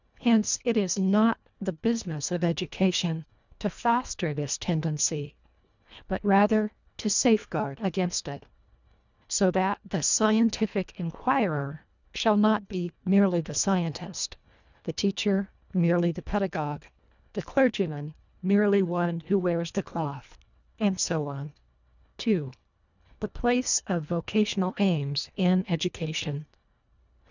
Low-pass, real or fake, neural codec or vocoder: 7.2 kHz; fake; codec, 24 kHz, 1.5 kbps, HILCodec